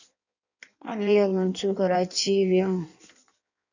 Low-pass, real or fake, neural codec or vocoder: 7.2 kHz; fake; codec, 16 kHz in and 24 kHz out, 1.1 kbps, FireRedTTS-2 codec